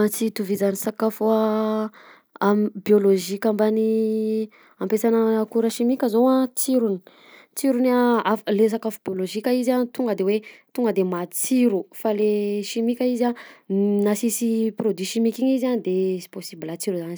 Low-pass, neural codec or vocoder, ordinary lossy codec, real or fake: none; none; none; real